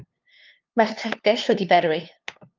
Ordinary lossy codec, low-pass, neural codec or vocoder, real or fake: Opus, 24 kbps; 7.2 kHz; codec, 16 kHz, 2 kbps, FunCodec, trained on LibriTTS, 25 frames a second; fake